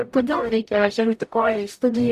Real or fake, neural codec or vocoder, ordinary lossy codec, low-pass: fake; codec, 44.1 kHz, 0.9 kbps, DAC; Opus, 64 kbps; 14.4 kHz